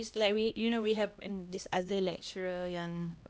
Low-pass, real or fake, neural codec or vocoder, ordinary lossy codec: none; fake; codec, 16 kHz, 1 kbps, X-Codec, HuBERT features, trained on LibriSpeech; none